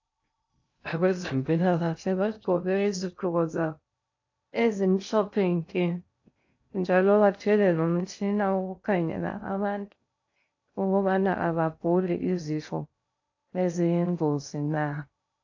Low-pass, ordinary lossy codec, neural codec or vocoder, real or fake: 7.2 kHz; AAC, 48 kbps; codec, 16 kHz in and 24 kHz out, 0.6 kbps, FocalCodec, streaming, 2048 codes; fake